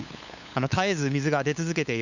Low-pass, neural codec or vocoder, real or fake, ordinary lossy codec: 7.2 kHz; codec, 16 kHz, 8 kbps, FunCodec, trained on LibriTTS, 25 frames a second; fake; none